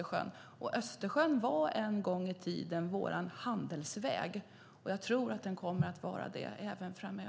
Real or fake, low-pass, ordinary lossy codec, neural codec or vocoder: real; none; none; none